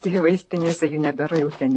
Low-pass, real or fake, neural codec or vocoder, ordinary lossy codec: 10.8 kHz; fake; vocoder, 44.1 kHz, 128 mel bands, Pupu-Vocoder; AAC, 48 kbps